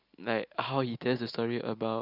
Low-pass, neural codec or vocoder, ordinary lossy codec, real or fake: 5.4 kHz; none; Opus, 64 kbps; real